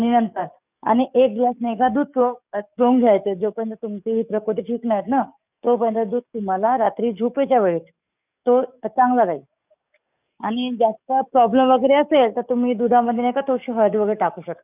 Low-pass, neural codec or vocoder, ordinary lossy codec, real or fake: 3.6 kHz; codec, 44.1 kHz, 7.8 kbps, DAC; none; fake